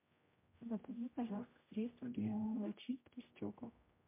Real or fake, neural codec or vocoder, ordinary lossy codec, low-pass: fake; codec, 16 kHz, 0.5 kbps, X-Codec, HuBERT features, trained on general audio; MP3, 24 kbps; 3.6 kHz